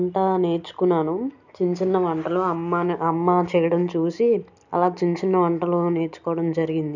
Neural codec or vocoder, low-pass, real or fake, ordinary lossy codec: none; 7.2 kHz; real; none